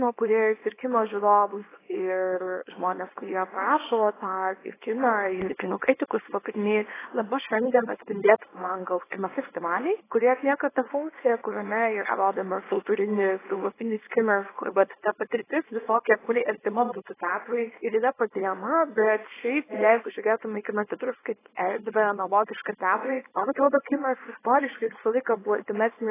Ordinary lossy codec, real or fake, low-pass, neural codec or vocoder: AAC, 16 kbps; fake; 3.6 kHz; codec, 24 kHz, 0.9 kbps, WavTokenizer, small release